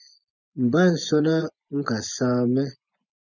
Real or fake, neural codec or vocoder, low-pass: fake; vocoder, 24 kHz, 100 mel bands, Vocos; 7.2 kHz